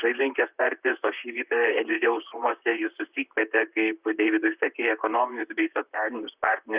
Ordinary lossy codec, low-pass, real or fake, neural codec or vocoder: Opus, 64 kbps; 3.6 kHz; fake; vocoder, 44.1 kHz, 128 mel bands, Pupu-Vocoder